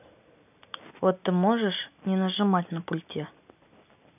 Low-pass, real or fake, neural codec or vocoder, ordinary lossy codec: 3.6 kHz; real; none; none